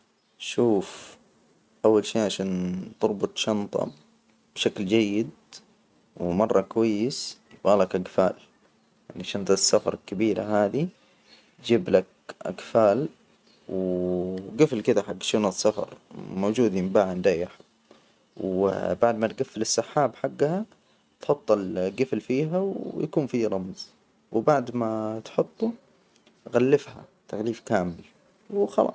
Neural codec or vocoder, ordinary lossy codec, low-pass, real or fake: none; none; none; real